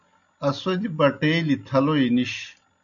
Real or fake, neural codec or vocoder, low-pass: real; none; 7.2 kHz